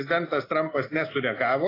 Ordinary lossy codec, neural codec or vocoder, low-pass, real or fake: AAC, 24 kbps; none; 5.4 kHz; real